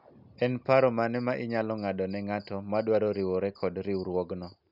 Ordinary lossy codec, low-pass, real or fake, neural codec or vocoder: none; 5.4 kHz; real; none